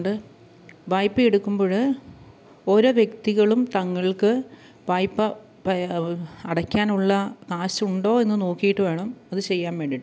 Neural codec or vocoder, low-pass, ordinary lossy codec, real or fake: none; none; none; real